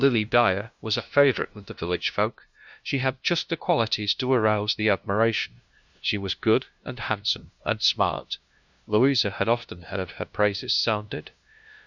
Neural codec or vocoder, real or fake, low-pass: codec, 16 kHz, 0.5 kbps, FunCodec, trained on LibriTTS, 25 frames a second; fake; 7.2 kHz